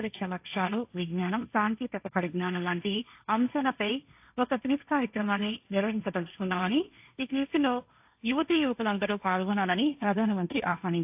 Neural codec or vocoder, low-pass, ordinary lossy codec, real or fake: codec, 16 kHz, 1.1 kbps, Voila-Tokenizer; 3.6 kHz; AAC, 32 kbps; fake